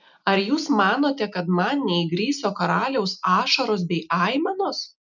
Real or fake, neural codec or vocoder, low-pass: real; none; 7.2 kHz